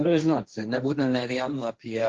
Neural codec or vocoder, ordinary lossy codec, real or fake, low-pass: codec, 16 kHz, 1.1 kbps, Voila-Tokenizer; Opus, 16 kbps; fake; 7.2 kHz